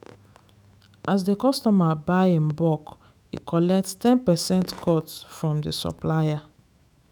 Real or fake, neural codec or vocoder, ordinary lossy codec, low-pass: fake; autoencoder, 48 kHz, 128 numbers a frame, DAC-VAE, trained on Japanese speech; none; none